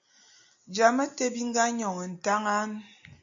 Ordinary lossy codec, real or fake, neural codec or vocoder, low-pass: AAC, 64 kbps; real; none; 7.2 kHz